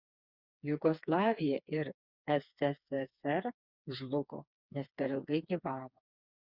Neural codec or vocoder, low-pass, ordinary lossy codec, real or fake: codec, 16 kHz, 4 kbps, FreqCodec, smaller model; 5.4 kHz; Opus, 64 kbps; fake